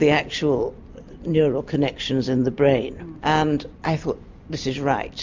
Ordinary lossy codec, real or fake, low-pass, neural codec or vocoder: MP3, 64 kbps; real; 7.2 kHz; none